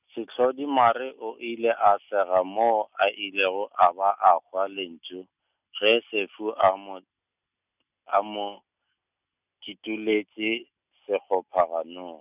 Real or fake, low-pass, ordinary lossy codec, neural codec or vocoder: real; 3.6 kHz; none; none